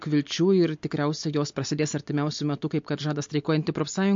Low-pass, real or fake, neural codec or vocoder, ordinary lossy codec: 7.2 kHz; real; none; MP3, 48 kbps